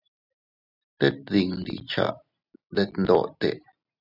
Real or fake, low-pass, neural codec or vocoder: real; 5.4 kHz; none